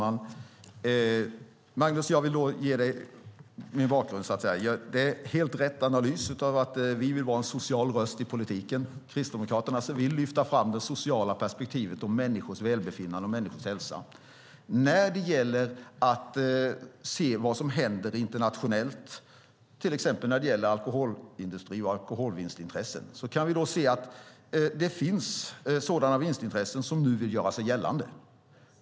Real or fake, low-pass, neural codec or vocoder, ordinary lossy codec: real; none; none; none